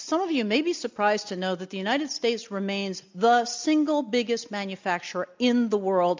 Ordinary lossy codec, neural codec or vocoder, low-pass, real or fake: MP3, 64 kbps; none; 7.2 kHz; real